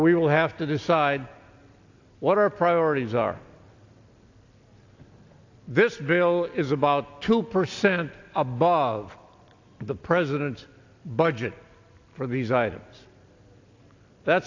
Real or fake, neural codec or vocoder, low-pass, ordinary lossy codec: real; none; 7.2 kHz; AAC, 48 kbps